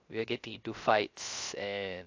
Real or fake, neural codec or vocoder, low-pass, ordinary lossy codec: fake; codec, 16 kHz, 0.3 kbps, FocalCodec; 7.2 kHz; AAC, 48 kbps